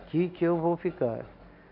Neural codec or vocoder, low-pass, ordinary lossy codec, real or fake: none; 5.4 kHz; none; real